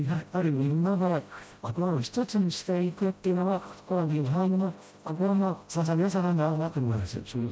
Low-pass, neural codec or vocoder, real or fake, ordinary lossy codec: none; codec, 16 kHz, 0.5 kbps, FreqCodec, smaller model; fake; none